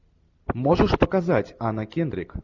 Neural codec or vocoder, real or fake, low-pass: none; real; 7.2 kHz